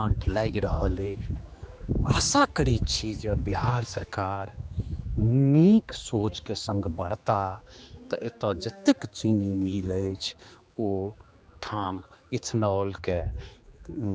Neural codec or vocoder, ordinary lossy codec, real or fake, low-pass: codec, 16 kHz, 2 kbps, X-Codec, HuBERT features, trained on general audio; none; fake; none